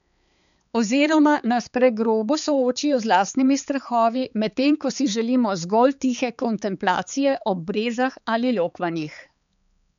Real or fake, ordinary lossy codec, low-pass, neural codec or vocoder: fake; none; 7.2 kHz; codec, 16 kHz, 4 kbps, X-Codec, HuBERT features, trained on balanced general audio